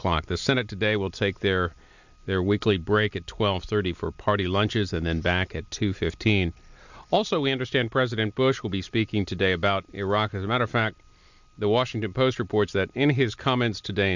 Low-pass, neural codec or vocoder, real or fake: 7.2 kHz; none; real